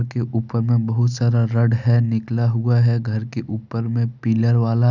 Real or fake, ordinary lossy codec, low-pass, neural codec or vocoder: real; none; 7.2 kHz; none